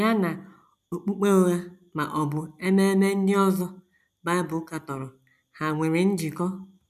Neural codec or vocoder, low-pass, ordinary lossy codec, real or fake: none; 14.4 kHz; none; real